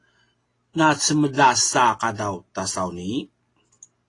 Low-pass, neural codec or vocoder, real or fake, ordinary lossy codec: 10.8 kHz; none; real; AAC, 32 kbps